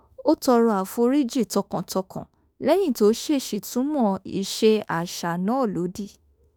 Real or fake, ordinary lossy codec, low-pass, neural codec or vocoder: fake; none; none; autoencoder, 48 kHz, 32 numbers a frame, DAC-VAE, trained on Japanese speech